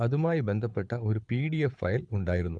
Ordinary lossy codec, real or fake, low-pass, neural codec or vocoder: none; fake; 9.9 kHz; codec, 24 kHz, 6 kbps, HILCodec